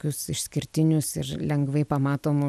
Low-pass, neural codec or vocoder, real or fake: 14.4 kHz; none; real